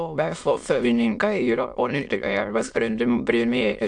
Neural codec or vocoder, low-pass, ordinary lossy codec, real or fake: autoencoder, 22.05 kHz, a latent of 192 numbers a frame, VITS, trained on many speakers; 9.9 kHz; AAC, 64 kbps; fake